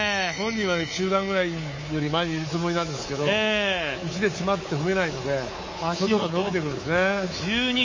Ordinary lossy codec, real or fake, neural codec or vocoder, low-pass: MP3, 32 kbps; fake; codec, 24 kHz, 3.1 kbps, DualCodec; 7.2 kHz